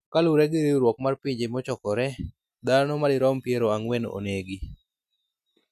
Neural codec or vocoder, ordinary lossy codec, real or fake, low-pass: none; none; real; 14.4 kHz